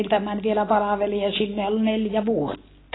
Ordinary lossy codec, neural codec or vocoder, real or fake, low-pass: AAC, 16 kbps; none; real; 7.2 kHz